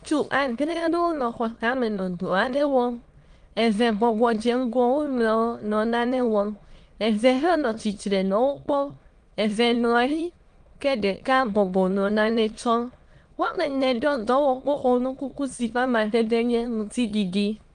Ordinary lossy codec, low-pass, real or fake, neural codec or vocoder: Opus, 32 kbps; 9.9 kHz; fake; autoencoder, 22.05 kHz, a latent of 192 numbers a frame, VITS, trained on many speakers